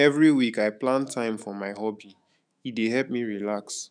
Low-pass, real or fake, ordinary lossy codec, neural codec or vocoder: 9.9 kHz; fake; none; autoencoder, 48 kHz, 128 numbers a frame, DAC-VAE, trained on Japanese speech